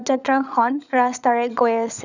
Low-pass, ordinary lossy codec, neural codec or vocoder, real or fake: 7.2 kHz; none; codec, 16 kHz, 16 kbps, FunCodec, trained on LibriTTS, 50 frames a second; fake